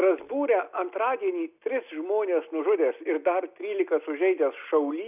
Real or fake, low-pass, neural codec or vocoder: real; 3.6 kHz; none